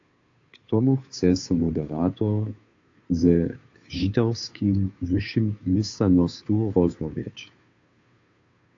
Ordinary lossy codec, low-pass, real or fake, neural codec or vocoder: AAC, 48 kbps; 7.2 kHz; fake; codec, 16 kHz, 2 kbps, FunCodec, trained on Chinese and English, 25 frames a second